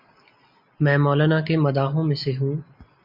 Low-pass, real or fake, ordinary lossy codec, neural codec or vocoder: 5.4 kHz; real; MP3, 48 kbps; none